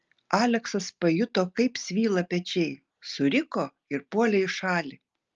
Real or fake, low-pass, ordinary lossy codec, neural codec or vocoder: real; 7.2 kHz; Opus, 24 kbps; none